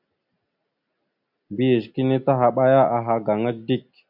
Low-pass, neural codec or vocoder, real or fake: 5.4 kHz; none; real